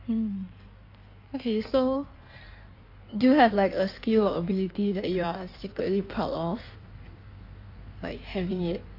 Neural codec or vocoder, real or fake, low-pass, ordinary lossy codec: codec, 16 kHz in and 24 kHz out, 1.1 kbps, FireRedTTS-2 codec; fake; 5.4 kHz; AAC, 32 kbps